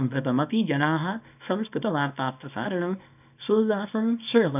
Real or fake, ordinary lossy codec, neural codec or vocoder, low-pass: fake; none; codec, 24 kHz, 0.9 kbps, WavTokenizer, small release; 3.6 kHz